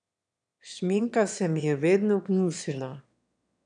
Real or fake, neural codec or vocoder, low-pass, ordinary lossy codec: fake; autoencoder, 22.05 kHz, a latent of 192 numbers a frame, VITS, trained on one speaker; 9.9 kHz; none